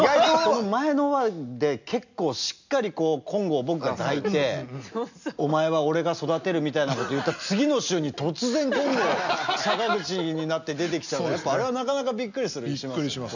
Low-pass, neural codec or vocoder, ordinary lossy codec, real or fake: 7.2 kHz; none; none; real